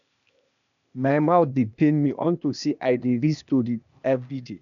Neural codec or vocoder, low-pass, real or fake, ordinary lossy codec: codec, 16 kHz, 0.8 kbps, ZipCodec; 7.2 kHz; fake; none